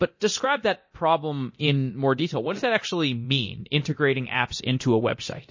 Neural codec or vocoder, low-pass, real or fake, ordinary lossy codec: codec, 24 kHz, 0.9 kbps, DualCodec; 7.2 kHz; fake; MP3, 32 kbps